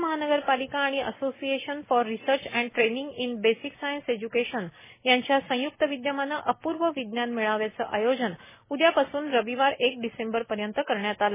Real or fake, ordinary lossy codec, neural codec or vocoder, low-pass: real; MP3, 16 kbps; none; 3.6 kHz